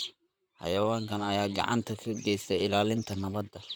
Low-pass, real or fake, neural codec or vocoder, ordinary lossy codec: none; fake; vocoder, 44.1 kHz, 128 mel bands, Pupu-Vocoder; none